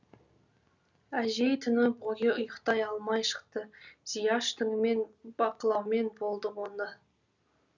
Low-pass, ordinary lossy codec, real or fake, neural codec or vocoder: 7.2 kHz; none; real; none